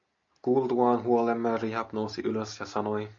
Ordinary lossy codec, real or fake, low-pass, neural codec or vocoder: MP3, 64 kbps; real; 7.2 kHz; none